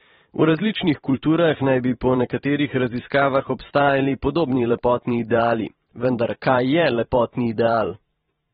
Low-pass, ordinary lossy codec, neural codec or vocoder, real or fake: 19.8 kHz; AAC, 16 kbps; vocoder, 44.1 kHz, 128 mel bands every 512 samples, BigVGAN v2; fake